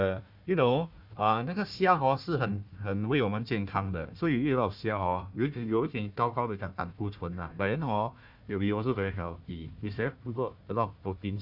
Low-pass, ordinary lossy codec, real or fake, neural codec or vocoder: 5.4 kHz; none; fake; codec, 16 kHz, 1 kbps, FunCodec, trained on Chinese and English, 50 frames a second